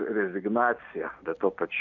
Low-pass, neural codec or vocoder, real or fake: 7.2 kHz; none; real